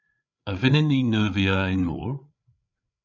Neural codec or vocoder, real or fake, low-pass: codec, 16 kHz, 8 kbps, FreqCodec, larger model; fake; 7.2 kHz